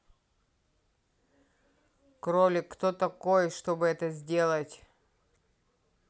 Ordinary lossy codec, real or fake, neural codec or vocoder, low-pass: none; real; none; none